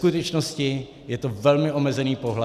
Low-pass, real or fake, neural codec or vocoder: 14.4 kHz; real; none